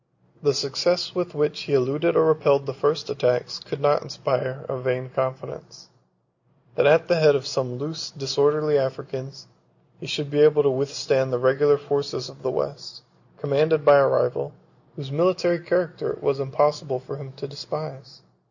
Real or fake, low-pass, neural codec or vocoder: real; 7.2 kHz; none